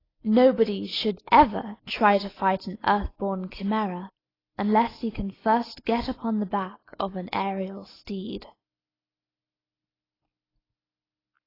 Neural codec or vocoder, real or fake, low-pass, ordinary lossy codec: none; real; 5.4 kHz; AAC, 24 kbps